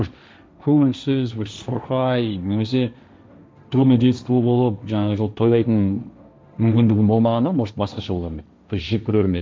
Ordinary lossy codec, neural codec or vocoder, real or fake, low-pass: none; codec, 16 kHz, 1.1 kbps, Voila-Tokenizer; fake; none